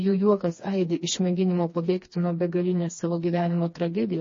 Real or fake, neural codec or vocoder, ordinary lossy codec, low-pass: fake; codec, 16 kHz, 2 kbps, FreqCodec, smaller model; MP3, 32 kbps; 7.2 kHz